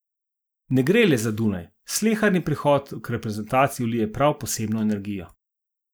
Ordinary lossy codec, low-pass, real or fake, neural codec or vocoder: none; none; real; none